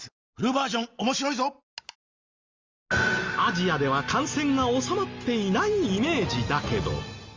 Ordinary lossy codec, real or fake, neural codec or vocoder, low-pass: Opus, 32 kbps; real; none; 7.2 kHz